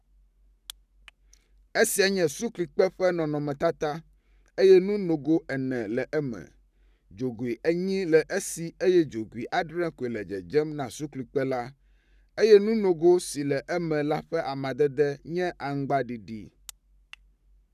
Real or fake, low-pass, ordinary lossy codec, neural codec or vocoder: fake; 14.4 kHz; none; codec, 44.1 kHz, 7.8 kbps, Pupu-Codec